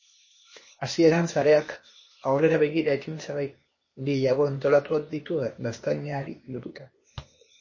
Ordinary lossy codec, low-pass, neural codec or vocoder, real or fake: MP3, 32 kbps; 7.2 kHz; codec, 16 kHz, 0.8 kbps, ZipCodec; fake